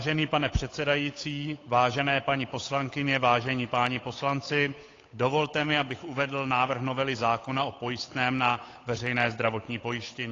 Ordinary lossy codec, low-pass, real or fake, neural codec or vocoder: AAC, 32 kbps; 7.2 kHz; fake; codec, 16 kHz, 8 kbps, FunCodec, trained on Chinese and English, 25 frames a second